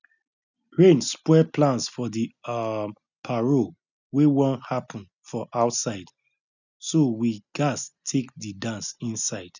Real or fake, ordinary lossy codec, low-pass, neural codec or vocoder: real; none; 7.2 kHz; none